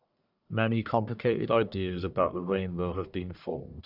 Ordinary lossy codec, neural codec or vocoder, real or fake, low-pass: none; codec, 44.1 kHz, 1.7 kbps, Pupu-Codec; fake; 5.4 kHz